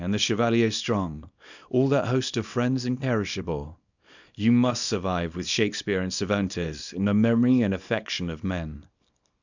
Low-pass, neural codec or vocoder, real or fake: 7.2 kHz; codec, 24 kHz, 0.9 kbps, WavTokenizer, small release; fake